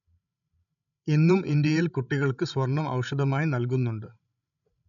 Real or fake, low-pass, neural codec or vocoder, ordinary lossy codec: fake; 7.2 kHz; codec, 16 kHz, 16 kbps, FreqCodec, larger model; none